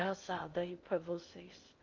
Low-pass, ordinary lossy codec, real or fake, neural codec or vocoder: 7.2 kHz; Opus, 32 kbps; fake; codec, 16 kHz in and 24 kHz out, 0.8 kbps, FocalCodec, streaming, 65536 codes